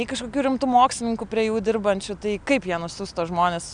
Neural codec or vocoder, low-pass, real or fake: none; 9.9 kHz; real